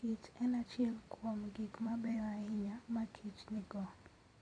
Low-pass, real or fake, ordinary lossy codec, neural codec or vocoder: 9.9 kHz; fake; none; vocoder, 44.1 kHz, 128 mel bands every 256 samples, BigVGAN v2